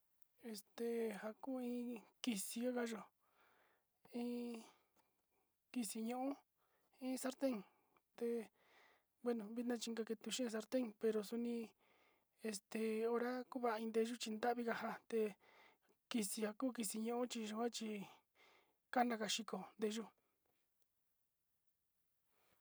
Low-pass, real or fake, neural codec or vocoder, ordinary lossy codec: none; real; none; none